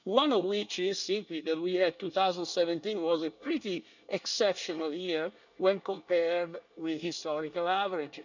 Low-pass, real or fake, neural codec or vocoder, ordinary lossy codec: 7.2 kHz; fake; codec, 24 kHz, 1 kbps, SNAC; none